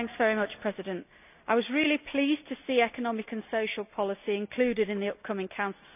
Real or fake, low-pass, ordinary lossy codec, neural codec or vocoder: real; 3.6 kHz; none; none